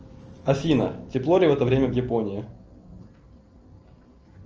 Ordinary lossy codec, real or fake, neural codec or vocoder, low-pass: Opus, 24 kbps; real; none; 7.2 kHz